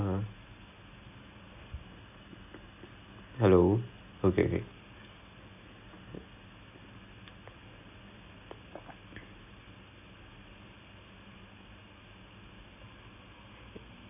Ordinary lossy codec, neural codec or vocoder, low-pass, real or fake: none; none; 3.6 kHz; real